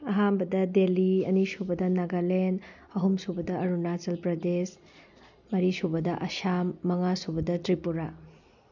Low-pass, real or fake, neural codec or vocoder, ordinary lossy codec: 7.2 kHz; real; none; none